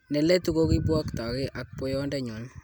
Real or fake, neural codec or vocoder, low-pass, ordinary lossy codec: real; none; none; none